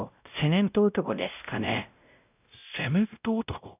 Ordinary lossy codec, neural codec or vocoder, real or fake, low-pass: none; codec, 16 kHz, 0.5 kbps, X-Codec, WavLM features, trained on Multilingual LibriSpeech; fake; 3.6 kHz